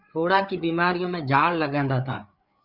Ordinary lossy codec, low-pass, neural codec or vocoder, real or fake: Opus, 64 kbps; 5.4 kHz; codec, 16 kHz, 4 kbps, FreqCodec, larger model; fake